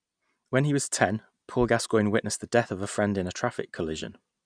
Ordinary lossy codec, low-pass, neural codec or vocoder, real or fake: none; 9.9 kHz; none; real